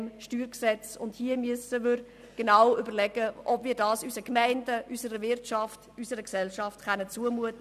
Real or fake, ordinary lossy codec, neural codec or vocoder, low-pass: real; none; none; 14.4 kHz